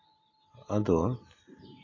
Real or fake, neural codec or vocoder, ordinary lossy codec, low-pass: real; none; AAC, 32 kbps; 7.2 kHz